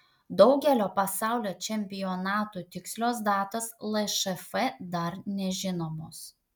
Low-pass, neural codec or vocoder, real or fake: 19.8 kHz; none; real